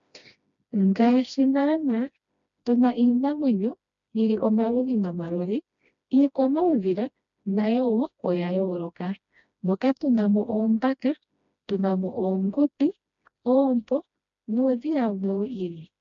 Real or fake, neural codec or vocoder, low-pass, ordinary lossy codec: fake; codec, 16 kHz, 1 kbps, FreqCodec, smaller model; 7.2 kHz; MP3, 64 kbps